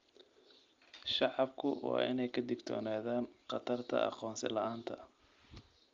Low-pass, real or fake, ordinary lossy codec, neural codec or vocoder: 7.2 kHz; real; Opus, 32 kbps; none